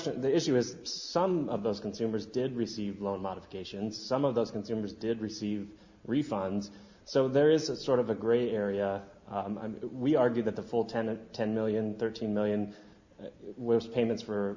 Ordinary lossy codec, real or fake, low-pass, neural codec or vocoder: Opus, 64 kbps; real; 7.2 kHz; none